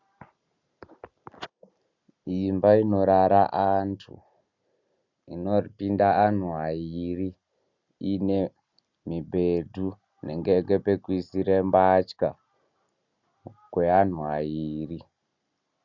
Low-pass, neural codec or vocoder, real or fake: 7.2 kHz; none; real